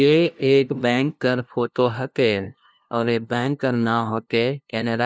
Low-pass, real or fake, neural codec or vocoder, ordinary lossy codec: none; fake; codec, 16 kHz, 0.5 kbps, FunCodec, trained on LibriTTS, 25 frames a second; none